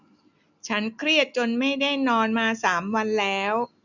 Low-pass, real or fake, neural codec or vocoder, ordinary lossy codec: 7.2 kHz; real; none; none